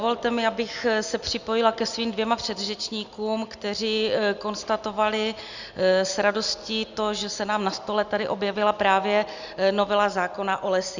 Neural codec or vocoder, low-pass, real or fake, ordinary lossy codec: none; 7.2 kHz; real; Opus, 64 kbps